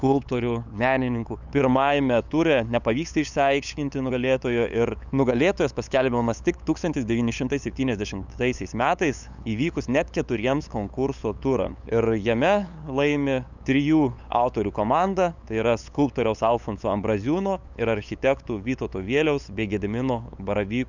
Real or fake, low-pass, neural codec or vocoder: fake; 7.2 kHz; codec, 16 kHz, 8 kbps, FunCodec, trained on LibriTTS, 25 frames a second